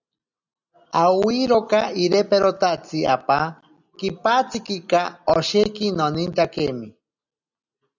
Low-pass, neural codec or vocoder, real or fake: 7.2 kHz; none; real